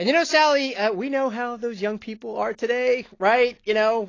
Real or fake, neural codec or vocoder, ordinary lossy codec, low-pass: real; none; AAC, 32 kbps; 7.2 kHz